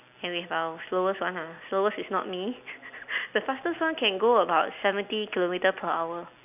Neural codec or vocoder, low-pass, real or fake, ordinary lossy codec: none; 3.6 kHz; real; none